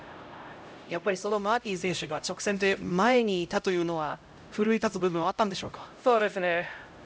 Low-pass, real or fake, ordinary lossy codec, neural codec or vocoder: none; fake; none; codec, 16 kHz, 0.5 kbps, X-Codec, HuBERT features, trained on LibriSpeech